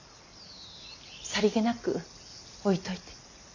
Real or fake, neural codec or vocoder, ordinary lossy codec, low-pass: real; none; none; 7.2 kHz